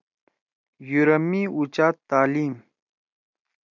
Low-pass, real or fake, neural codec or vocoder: 7.2 kHz; real; none